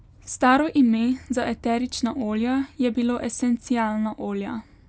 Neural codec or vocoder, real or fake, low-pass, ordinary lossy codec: none; real; none; none